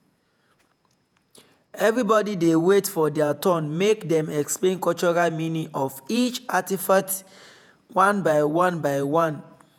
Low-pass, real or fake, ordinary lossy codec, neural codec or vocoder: none; fake; none; vocoder, 48 kHz, 128 mel bands, Vocos